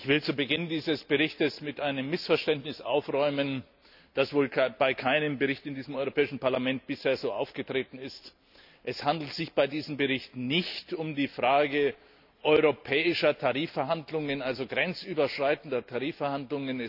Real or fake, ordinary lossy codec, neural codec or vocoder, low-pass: real; none; none; 5.4 kHz